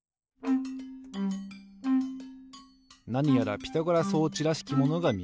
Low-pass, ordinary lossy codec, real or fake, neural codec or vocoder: none; none; real; none